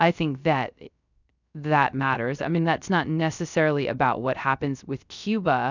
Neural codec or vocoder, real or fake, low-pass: codec, 16 kHz, 0.3 kbps, FocalCodec; fake; 7.2 kHz